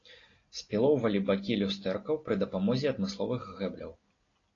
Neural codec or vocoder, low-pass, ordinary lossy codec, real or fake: none; 7.2 kHz; AAC, 32 kbps; real